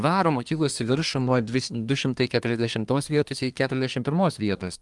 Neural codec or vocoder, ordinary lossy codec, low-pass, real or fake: codec, 24 kHz, 1 kbps, SNAC; Opus, 32 kbps; 10.8 kHz; fake